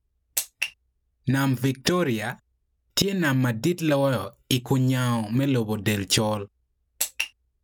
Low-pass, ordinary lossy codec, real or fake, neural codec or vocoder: none; none; real; none